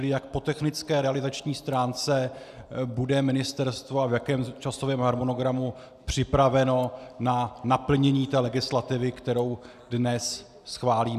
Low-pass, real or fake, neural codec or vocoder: 14.4 kHz; real; none